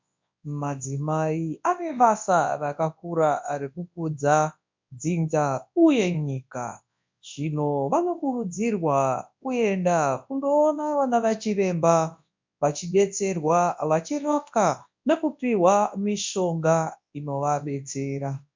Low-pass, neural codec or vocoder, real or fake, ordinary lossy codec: 7.2 kHz; codec, 24 kHz, 0.9 kbps, WavTokenizer, large speech release; fake; MP3, 64 kbps